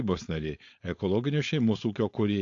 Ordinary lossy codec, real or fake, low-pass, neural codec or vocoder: AAC, 48 kbps; fake; 7.2 kHz; codec, 16 kHz, 4.8 kbps, FACodec